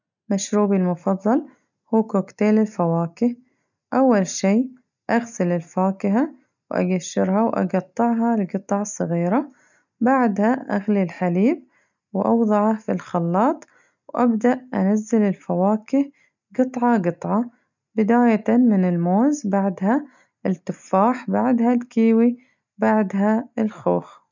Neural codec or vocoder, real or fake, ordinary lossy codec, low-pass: none; real; none; 7.2 kHz